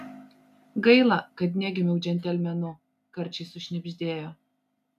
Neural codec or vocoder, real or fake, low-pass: none; real; 14.4 kHz